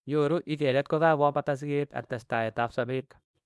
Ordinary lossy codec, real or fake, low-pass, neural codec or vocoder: none; fake; none; codec, 24 kHz, 0.9 kbps, WavTokenizer, small release